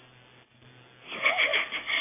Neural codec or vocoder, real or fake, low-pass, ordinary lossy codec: none; real; 3.6 kHz; AAC, 16 kbps